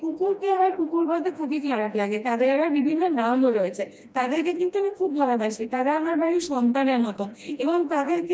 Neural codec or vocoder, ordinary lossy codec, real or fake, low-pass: codec, 16 kHz, 1 kbps, FreqCodec, smaller model; none; fake; none